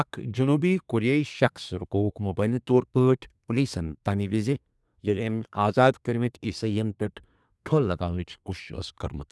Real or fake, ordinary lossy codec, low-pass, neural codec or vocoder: fake; none; none; codec, 24 kHz, 1 kbps, SNAC